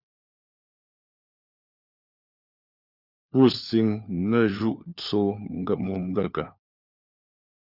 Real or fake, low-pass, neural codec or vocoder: fake; 5.4 kHz; codec, 16 kHz, 4 kbps, FunCodec, trained on LibriTTS, 50 frames a second